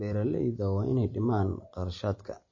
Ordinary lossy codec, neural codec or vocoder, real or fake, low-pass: MP3, 32 kbps; none; real; 7.2 kHz